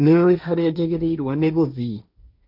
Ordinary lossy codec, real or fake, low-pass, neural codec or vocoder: none; fake; 5.4 kHz; codec, 16 kHz, 1.1 kbps, Voila-Tokenizer